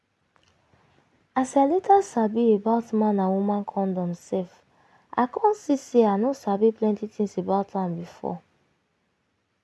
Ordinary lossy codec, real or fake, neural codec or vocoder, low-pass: none; real; none; none